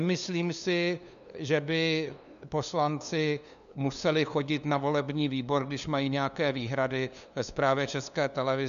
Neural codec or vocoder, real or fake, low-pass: codec, 16 kHz, 2 kbps, FunCodec, trained on LibriTTS, 25 frames a second; fake; 7.2 kHz